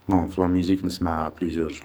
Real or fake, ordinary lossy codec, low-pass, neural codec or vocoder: fake; none; none; codec, 44.1 kHz, 2.6 kbps, SNAC